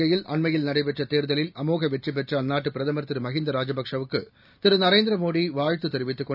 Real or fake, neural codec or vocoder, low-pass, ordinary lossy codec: real; none; 5.4 kHz; none